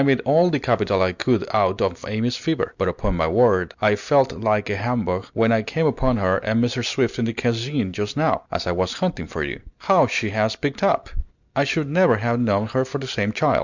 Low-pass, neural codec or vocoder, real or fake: 7.2 kHz; none; real